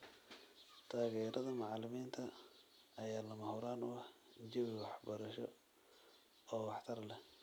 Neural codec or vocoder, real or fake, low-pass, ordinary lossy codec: none; real; none; none